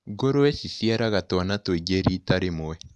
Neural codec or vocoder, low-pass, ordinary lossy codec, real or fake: none; none; none; real